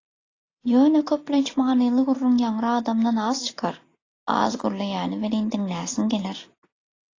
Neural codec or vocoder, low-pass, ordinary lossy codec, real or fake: none; 7.2 kHz; AAC, 32 kbps; real